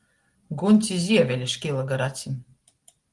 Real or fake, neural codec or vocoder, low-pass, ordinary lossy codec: real; none; 10.8 kHz; Opus, 24 kbps